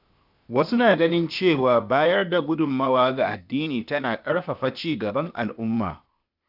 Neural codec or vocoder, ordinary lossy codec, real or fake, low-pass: codec, 16 kHz, 0.8 kbps, ZipCodec; none; fake; 5.4 kHz